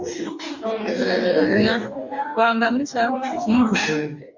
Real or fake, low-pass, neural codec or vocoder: fake; 7.2 kHz; codec, 44.1 kHz, 2.6 kbps, DAC